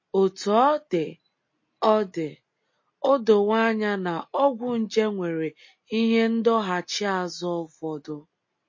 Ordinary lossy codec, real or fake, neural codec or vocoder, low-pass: MP3, 32 kbps; real; none; 7.2 kHz